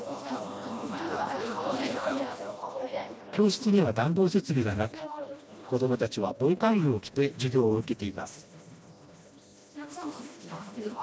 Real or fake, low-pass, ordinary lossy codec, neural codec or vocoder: fake; none; none; codec, 16 kHz, 1 kbps, FreqCodec, smaller model